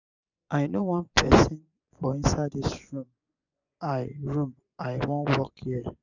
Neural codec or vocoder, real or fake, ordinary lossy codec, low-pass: vocoder, 24 kHz, 100 mel bands, Vocos; fake; none; 7.2 kHz